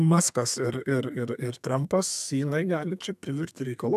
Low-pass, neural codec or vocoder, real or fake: 14.4 kHz; codec, 32 kHz, 1.9 kbps, SNAC; fake